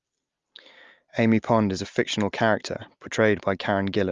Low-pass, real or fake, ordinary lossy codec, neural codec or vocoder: 7.2 kHz; real; Opus, 32 kbps; none